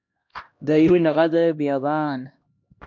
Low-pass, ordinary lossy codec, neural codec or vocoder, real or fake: 7.2 kHz; MP3, 64 kbps; codec, 16 kHz, 1 kbps, X-Codec, HuBERT features, trained on LibriSpeech; fake